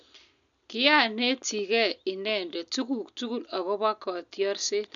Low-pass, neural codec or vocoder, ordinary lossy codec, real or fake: 7.2 kHz; none; none; real